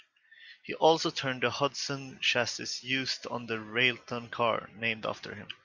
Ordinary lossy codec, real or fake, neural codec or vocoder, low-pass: Opus, 64 kbps; real; none; 7.2 kHz